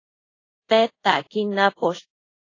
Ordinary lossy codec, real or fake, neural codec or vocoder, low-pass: AAC, 32 kbps; fake; codec, 24 kHz, 0.5 kbps, DualCodec; 7.2 kHz